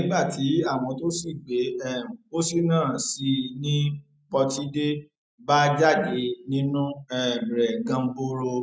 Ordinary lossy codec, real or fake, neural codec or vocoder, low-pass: none; real; none; none